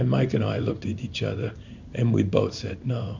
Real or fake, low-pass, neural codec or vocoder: fake; 7.2 kHz; codec, 16 kHz in and 24 kHz out, 1 kbps, XY-Tokenizer